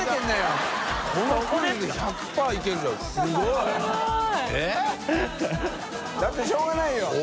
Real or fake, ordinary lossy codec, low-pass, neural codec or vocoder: real; none; none; none